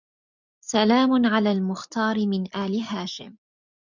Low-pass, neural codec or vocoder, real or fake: 7.2 kHz; none; real